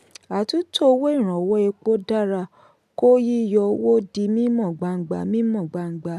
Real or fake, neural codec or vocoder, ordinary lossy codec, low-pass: real; none; MP3, 96 kbps; 14.4 kHz